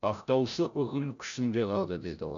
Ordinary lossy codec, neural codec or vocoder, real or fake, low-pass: none; codec, 16 kHz, 0.5 kbps, FreqCodec, larger model; fake; 7.2 kHz